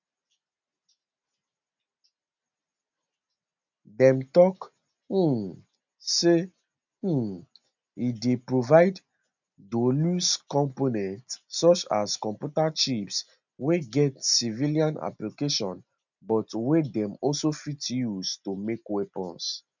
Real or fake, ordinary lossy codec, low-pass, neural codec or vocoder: real; none; 7.2 kHz; none